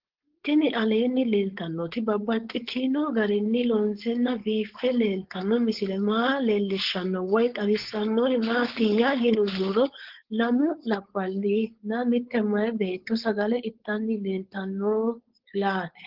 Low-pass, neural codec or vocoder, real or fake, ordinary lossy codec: 5.4 kHz; codec, 16 kHz, 4.8 kbps, FACodec; fake; Opus, 16 kbps